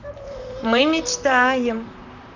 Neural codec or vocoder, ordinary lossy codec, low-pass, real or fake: vocoder, 44.1 kHz, 128 mel bands, Pupu-Vocoder; none; 7.2 kHz; fake